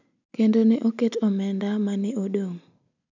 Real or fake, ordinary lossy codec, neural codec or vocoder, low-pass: real; none; none; 7.2 kHz